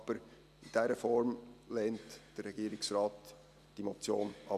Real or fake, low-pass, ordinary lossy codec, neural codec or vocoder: real; 14.4 kHz; none; none